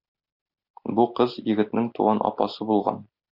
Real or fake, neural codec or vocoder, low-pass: real; none; 5.4 kHz